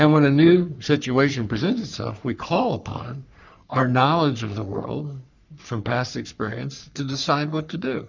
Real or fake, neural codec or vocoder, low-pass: fake; codec, 44.1 kHz, 3.4 kbps, Pupu-Codec; 7.2 kHz